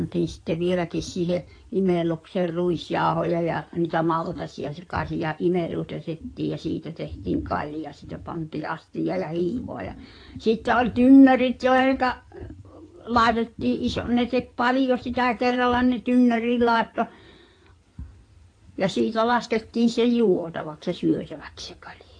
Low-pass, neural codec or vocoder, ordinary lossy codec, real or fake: 9.9 kHz; codec, 16 kHz in and 24 kHz out, 2.2 kbps, FireRedTTS-2 codec; AAC, 48 kbps; fake